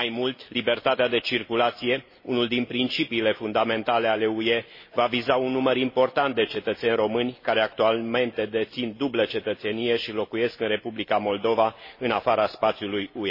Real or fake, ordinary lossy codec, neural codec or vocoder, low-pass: real; MP3, 24 kbps; none; 5.4 kHz